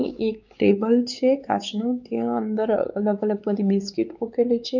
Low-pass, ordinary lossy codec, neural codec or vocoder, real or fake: 7.2 kHz; none; codec, 16 kHz, 4 kbps, X-Codec, WavLM features, trained on Multilingual LibriSpeech; fake